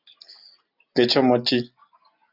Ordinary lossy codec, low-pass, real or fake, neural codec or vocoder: Opus, 64 kbps; 5.4 kHz; real; none